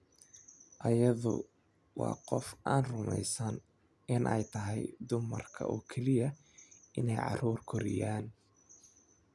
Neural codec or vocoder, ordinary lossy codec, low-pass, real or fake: none; none; none; real